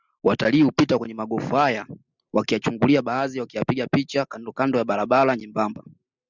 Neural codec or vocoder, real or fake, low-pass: none; real; 7.2 kHz